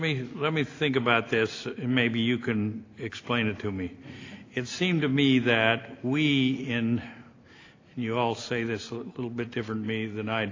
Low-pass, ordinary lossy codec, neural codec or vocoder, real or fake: 7.2 kHz; AAC, 32 kbps; none; real